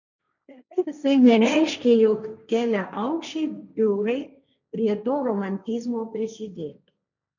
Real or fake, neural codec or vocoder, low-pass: fake; codec, 16 kHz, 1.1 kbps, Voila-Tokenizer; 7.2 kHz